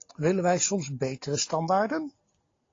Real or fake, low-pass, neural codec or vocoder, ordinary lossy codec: real; 7.2 kHz; none; AAC, 32 kbps